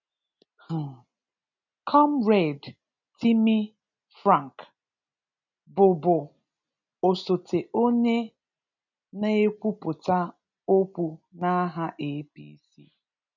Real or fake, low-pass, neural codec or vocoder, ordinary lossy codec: real; 7.2 kHz; none; none